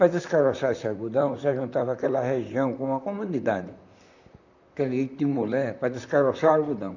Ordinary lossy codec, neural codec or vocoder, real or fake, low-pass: none; vocoder, 44.1 kHz, 128 mel bands, Pupu-Vocoder; fake; 7.2 kHz